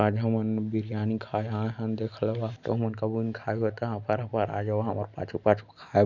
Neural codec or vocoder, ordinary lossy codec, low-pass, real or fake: none; none; 7.2 kHz; real